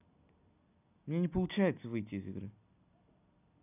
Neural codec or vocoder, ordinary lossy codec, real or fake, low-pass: none; none; real; 3.6 kHz